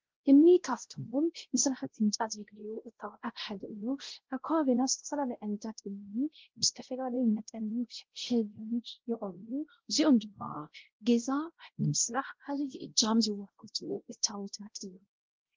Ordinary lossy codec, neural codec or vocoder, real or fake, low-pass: Opus, 16 kbps; codec, 16 kHz, 0.5 kbps, X-Codec, WavLM features, trained on Multilingual LibriSpeech; fake; 7.2 kHz